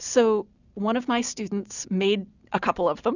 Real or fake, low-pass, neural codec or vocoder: real; 7.2 kHz; none